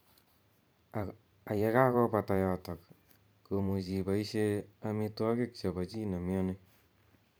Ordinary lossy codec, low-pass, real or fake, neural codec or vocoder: none; none; fake; vocoder, 44.1 kHz, 128 mel bands every 512 samples, BigVGAN v2